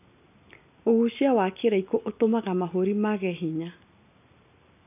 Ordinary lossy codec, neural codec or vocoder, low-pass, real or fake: none; none; 3.6 kHz; real